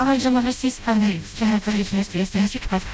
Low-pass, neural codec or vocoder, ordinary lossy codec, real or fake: none; codec, 16 kHz, 0.5 kbps, FreqCodec, smaller model; none; fake